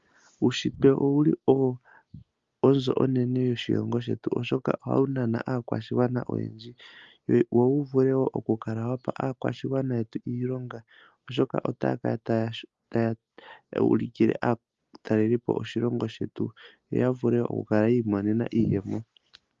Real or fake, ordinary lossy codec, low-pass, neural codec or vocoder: real; Opus, 32 kbps; 7.2 kHz; none